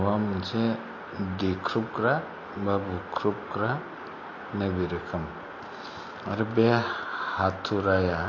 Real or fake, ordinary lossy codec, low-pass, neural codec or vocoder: real; MP3, 32 kbps; 7.2 kHz; none